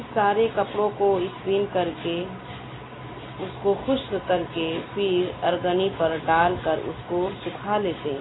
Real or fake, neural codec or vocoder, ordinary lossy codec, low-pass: real; none; AAC, 16 kbps; 7.2 kHz